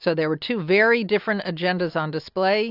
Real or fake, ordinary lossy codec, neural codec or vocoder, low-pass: real; AAC, 48 kbps; none; 5.4 kHz